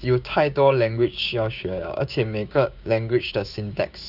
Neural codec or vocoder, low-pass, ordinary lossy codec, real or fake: codec, 16 kHz, 6 kbps, DAC; 5.4 kHz; none; fake